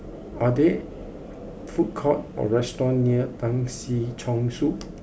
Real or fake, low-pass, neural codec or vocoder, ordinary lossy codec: real; none; none; none